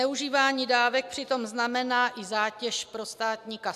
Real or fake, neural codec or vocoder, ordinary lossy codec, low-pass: real; none; AAC, 96 kbps; 14.4 kHz